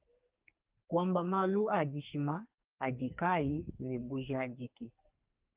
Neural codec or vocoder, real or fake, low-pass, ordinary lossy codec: codec, 44.1 kHz, 2.6 kbps, SNAC; fake; 3.6 kHz; Opus, 32 kbps